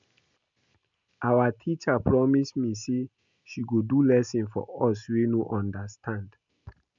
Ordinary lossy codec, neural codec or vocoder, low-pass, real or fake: MP3, 64 kbps; none; 7.2 kHz; real